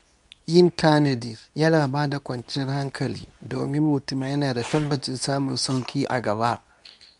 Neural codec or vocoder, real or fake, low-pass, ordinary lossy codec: codec, 24 kHz, 0.9 kbps, WavTokenizer, medium speech release version 2; fake; 10.8 kHz; none